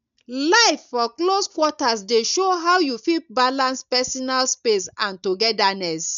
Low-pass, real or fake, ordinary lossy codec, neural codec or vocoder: 7.2 kHz; real; none; none